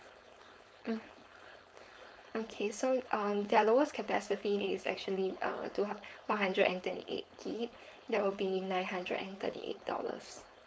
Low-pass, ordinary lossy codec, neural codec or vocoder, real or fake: none; none; codec, 16 kHz, 4.8 kbps, FACodec; fake